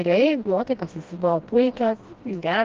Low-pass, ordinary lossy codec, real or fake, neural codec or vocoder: 7.2 kHz; Opus, 32 kbps; fake; codec, 16 kHz, 1 kbps, FreqCodec, smaller model